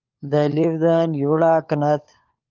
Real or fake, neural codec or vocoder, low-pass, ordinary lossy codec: fake; codec, 16 kHz, 4 kbps, FunCodec, trained on LibriTTS, 50 frames a second; 7.2 kHz; Opus, 32 kbps